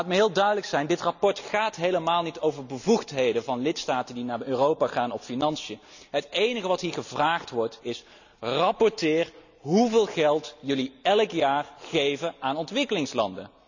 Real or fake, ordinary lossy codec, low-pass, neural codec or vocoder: real; none; 7.2 kHz; none